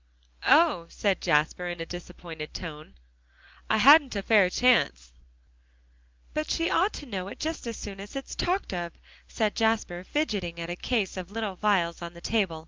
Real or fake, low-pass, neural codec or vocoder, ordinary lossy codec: real; 7.2 kHz; none; Opus, 24 kbps